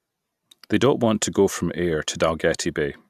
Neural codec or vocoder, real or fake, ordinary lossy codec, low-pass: none; real; AAC, 96 kbps; 14.4 kHz